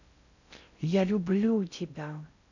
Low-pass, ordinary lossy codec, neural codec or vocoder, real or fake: 7.2 kHz; none; codec, 16 kHz in and 24 kHz out, 0.6 kbps, FocalCodec, streaming, 2048 codes; fake